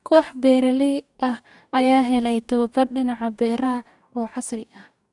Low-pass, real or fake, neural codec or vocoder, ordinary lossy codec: 10.8 kHz; fake; codec, 44.1 kHz, 2.6 kbps, DAC; none